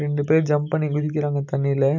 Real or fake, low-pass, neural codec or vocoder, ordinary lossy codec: real; 7.2 kHz; none; none